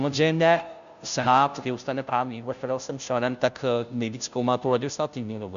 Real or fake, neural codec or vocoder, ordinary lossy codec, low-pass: fake; codec, 16 kHz, 0.5 kbps, FunCodec, trained on Chinese and English, 25 frames a second; AAC, 96 kbps; 7.2 kHz